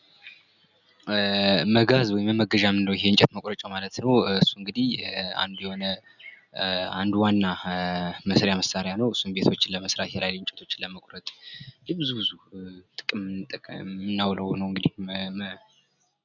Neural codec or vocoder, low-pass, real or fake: none; 7.2 kHz; real